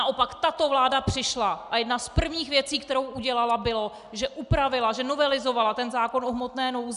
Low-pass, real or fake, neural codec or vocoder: 10.8 kHz; real; none